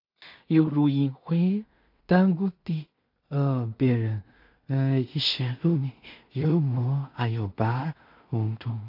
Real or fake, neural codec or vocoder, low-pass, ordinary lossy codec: fake; codec, 16 kHz in and 24 kHz out, 0.4 kbps, LongCat-Audio-Codec, two codebook decoder; 5.4 kHz; AAC, 48 kbps